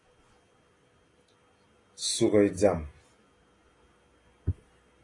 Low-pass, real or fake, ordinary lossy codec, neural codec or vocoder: 10.8 kHz; real; AAC, 32 kbps; none